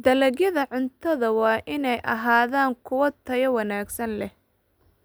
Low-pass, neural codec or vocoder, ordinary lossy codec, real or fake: none; none; none; real